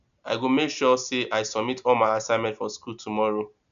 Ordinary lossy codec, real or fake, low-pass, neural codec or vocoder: none; real; 7.2 kHz; none